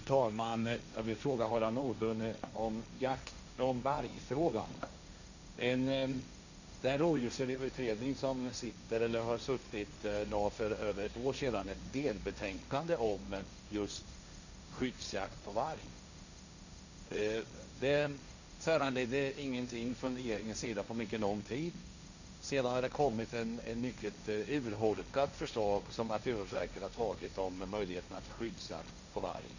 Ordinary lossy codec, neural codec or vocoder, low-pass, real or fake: none; codec, 16 kHz, 1.1 kbps, Voila-Tokenizer; 7.2 kHz; fake